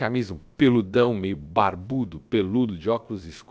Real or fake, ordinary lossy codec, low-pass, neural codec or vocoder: fake; none; none; codec, 16 kHz, about 1 kbps, DyCAST, with the encoder's durations